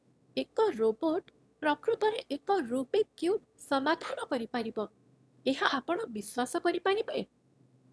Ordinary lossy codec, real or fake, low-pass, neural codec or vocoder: none; fake; none; autoencoder, 22.05 kHz, a latent of 192 numbers a frame, VITS, trained on one speaker